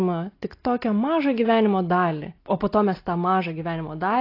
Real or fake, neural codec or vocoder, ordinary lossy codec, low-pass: real; none; AAC, 32 kbps; 5.4 kHz